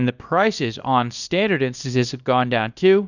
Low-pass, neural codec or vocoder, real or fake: 7.2 kHz; codec, 24 kHz, 0.9 kbps, WavTokenizer, small release; fake